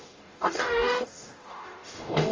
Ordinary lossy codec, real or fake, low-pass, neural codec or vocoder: Opus, 32 kbps; fake; 7.2 kHz; codec, 44.1 kHz, 0.9 kbps, DAC